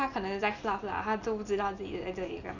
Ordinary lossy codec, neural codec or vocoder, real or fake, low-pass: none; none; real; 7.2 kHz